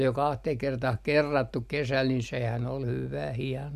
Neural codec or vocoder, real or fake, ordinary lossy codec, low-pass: none; real; MP3, 96 kbps; 14.4 kHz